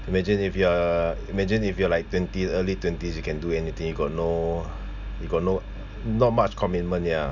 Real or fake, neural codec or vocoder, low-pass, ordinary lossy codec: real; none; 7.2 kHz; none